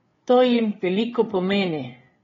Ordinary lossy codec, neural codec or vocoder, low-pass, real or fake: AAC, 24 kbps; codec, 16 kHz, 8 kbps, FreqCodec, larger model; 7.2 kHz; fake